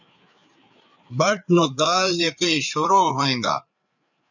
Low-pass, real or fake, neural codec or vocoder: 7.2 kHz; fake; codec, 16 kHz, 4 kbps, FreqCodec, larger model